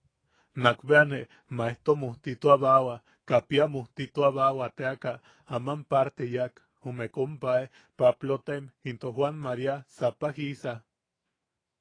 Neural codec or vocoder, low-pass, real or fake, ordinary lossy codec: autoencoder, 48 kHz, 128 numbers a frame, DAC-VAE, trained on Japanese speech; 9.9 kHz; fake; AAC, 32 kbps